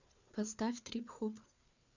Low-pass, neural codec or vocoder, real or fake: 7.2 kHz; codec, 16 kHz, 4 kbps, FreqCodec, larger model; fake